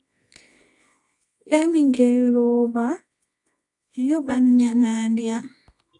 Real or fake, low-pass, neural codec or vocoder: fake; 10.8 kHz; codec, 24 kHz, 0.9 kbps, WavTokenizer, medium music audio release